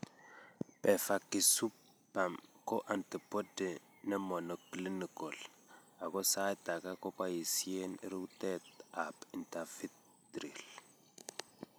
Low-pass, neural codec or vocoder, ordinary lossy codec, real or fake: none; none; none; real